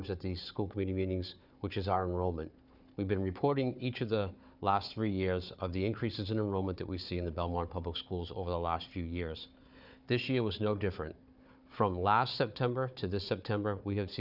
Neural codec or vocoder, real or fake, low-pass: codec, 16 kHz, 4 kbps, FunCodec, trained on Chinese and English, 50 frames a second; fake; 5.4 kHz